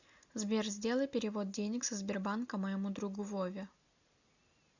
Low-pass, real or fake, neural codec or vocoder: 7.2 kHz; real; none